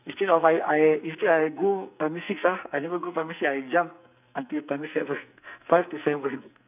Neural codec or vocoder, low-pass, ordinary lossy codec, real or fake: codec, 44.1 kHz, 2.6 kbps, SNAC; 3.6 kHz; none; fake